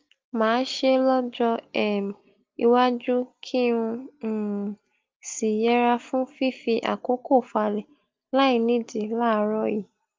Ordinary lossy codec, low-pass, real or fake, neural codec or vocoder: Opus, 32 kbps; 7.2 kHz; real; none